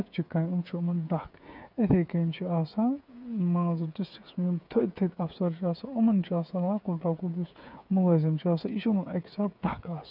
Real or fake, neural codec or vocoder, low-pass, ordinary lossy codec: fake; codec, 16 kHz, 8 kbps, FreqCodec, smaller model; 5.4 kHz; none